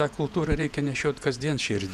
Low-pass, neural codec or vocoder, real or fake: 14.4 kHz; vocoder, 48 kHz, 128 mel bands, Vocos; fake